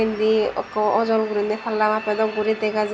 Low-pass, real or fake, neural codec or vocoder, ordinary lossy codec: none; real; none; none